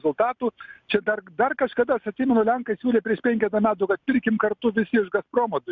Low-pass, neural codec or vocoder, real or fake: 7.2 kHz; none; real